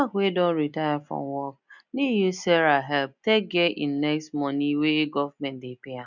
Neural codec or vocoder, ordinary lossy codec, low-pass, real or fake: none; none; 7.2 kHz; real